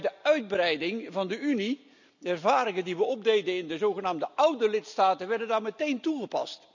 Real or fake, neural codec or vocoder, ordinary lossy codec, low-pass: real; none; none; 7.2 kHz